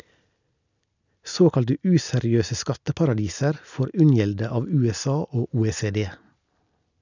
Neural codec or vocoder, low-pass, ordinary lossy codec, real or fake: none; 7.2 kHz; none; real